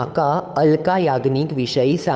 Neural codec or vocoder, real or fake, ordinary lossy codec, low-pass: codec, 16 kHz, 8 kbps, FunCodec, trained on Chinese and English, 25 frames a second; fake; none; none